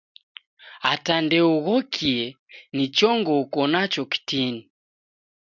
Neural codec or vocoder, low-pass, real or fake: none; 7.2 kHz; real